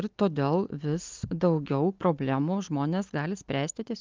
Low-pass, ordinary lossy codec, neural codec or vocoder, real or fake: 7.2 kHz; Opus, 24 kbps; none; real